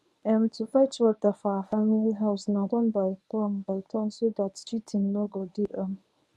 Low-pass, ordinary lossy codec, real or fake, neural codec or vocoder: none; none; fake; codec, 24 kHz, 0.9 kbps, WavTokenizer, medium speech release version 1